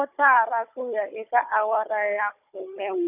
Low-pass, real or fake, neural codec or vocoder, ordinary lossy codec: 3.6 kHz; fake; codec, 16 kHz, 16 kbps, FunCodec, trained on Chinese and English, 50 frames a second; none